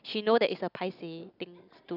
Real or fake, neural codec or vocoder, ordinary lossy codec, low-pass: real; none; none; 5.4 kHz